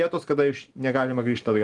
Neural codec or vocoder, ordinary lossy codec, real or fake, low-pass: none; Opus, 24 kbps; real; 10.8 kHz